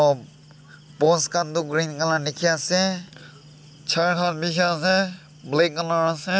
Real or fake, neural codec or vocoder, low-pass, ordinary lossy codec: real; none; none; none